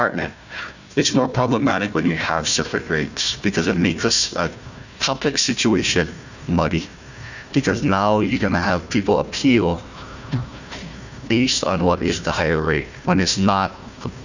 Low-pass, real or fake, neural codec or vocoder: 7.2 kHz; fake; codec, 16 kHz, 1 kbps, FunCodec, trained on Chinese and English, 50 frames a second